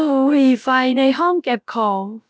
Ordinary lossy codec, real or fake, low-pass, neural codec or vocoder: none; fake; none; codec, 16 kHz, about 1 kbps, DyCAST, with the encoder's durations